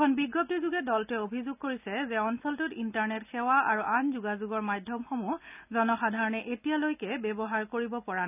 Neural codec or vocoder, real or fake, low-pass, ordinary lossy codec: none; real; 3.6 kHz; none